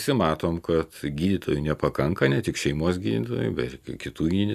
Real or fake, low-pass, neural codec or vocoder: real; 14.4 kHz; none